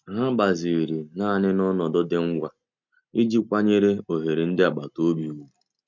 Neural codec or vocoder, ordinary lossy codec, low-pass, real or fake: none; none; 7.2 kHz; real